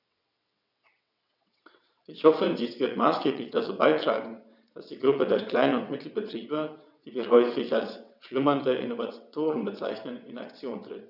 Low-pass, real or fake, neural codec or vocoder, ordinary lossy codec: 5.4 kHz; fake; vocoder, 22.05 kHz, 80 mel bands, WaveNeXt; none